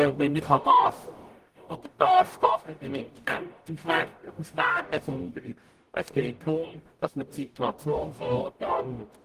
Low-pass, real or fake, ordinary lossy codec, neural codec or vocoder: 14.4 kHz; fake; Opus, 24 kbps; codec, 44.1 kHz, 0.9 kbps, DAC